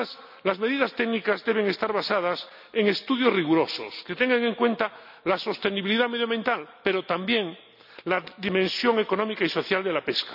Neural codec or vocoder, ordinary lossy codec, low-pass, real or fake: none; none; 5.4 kHz; real